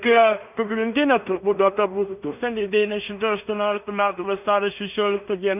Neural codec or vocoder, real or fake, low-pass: codec, 16 kHz in and 24 kHz out, 0.4 kbps, LongCat-Audio-Codec, two codebook decoder; fake; 3.6 kHz